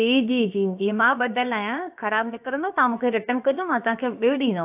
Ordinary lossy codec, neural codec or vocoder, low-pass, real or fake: AAC, 32 kbps; codec, 16 kHz, about 1 kbps, DyCAST, with the encoder's durations; 3.6 kHz; fake